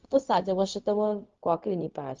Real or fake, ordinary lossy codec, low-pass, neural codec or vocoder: fake; Opus, 32 kbps; 7.2 kHz; codec, 16 kHz, 0.4 kbps, LongCat-Audio-Codec